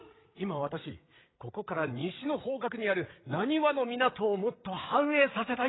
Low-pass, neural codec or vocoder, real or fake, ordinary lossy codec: 7.2 kHz; codec, 16 kHz, 8 kbps, FreqCodec, larger model; fake; AAC, 16 kbps